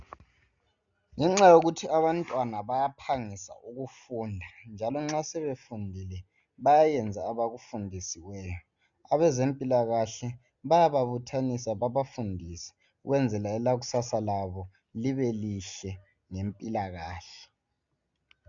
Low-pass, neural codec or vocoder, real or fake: 7.2 kHz; none; real